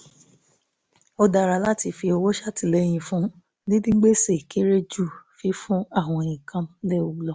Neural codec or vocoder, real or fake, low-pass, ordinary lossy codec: none; real; none; none